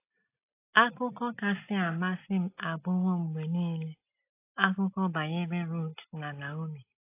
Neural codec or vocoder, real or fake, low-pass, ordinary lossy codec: none; real; 3.6 kHz; AAC, 24 kbps